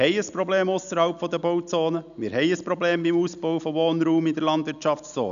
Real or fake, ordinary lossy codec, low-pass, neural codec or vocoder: real; none; 7.2 kHz; none